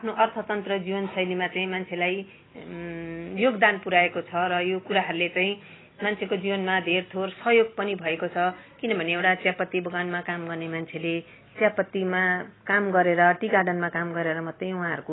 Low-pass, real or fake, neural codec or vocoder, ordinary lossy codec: 7.2 kHz; real; none; AAC, 16 kbps